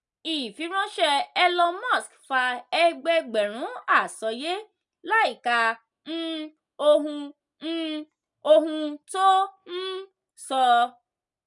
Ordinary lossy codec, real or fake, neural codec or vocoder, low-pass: none; real; none; 10.8 kHz